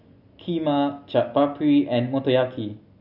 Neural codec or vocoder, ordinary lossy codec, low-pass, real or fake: none; none; 5.4 kHz; real